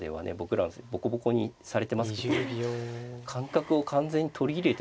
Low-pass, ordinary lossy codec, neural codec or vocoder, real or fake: none; none; none; real